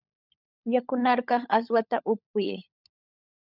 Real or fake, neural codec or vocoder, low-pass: fake; codec, 16 kHz, 16 kbps, FunCodec, trained on LibriTTS, 50 frames a second; 5.4 kHz